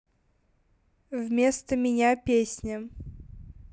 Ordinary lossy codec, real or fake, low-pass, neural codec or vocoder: none; real; none; none